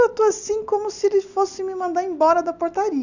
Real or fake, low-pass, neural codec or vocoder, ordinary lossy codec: real; 7.2 kHz; none; none